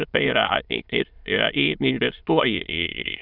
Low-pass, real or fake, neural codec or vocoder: 5.4 kHz; fake; autoencoder, 22.05 kHz, a latent of 192 numbers a frame, VITS, trained on many speakers